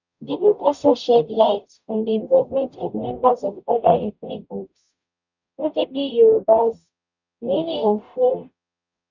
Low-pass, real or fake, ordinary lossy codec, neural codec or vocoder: 7.2 kHz; fake; none; codec, 44.1 kHz, 0.9 kbps, DAC